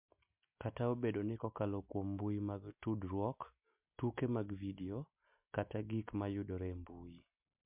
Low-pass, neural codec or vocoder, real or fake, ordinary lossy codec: 3.6 kHz; none; real; MP3, 32 kbps